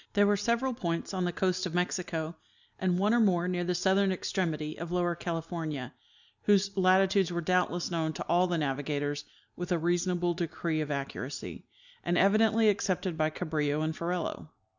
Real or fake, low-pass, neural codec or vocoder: real; 7.2 kHz; none